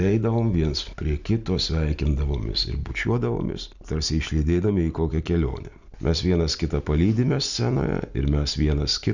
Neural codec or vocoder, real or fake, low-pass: none; real; 7.2 kHz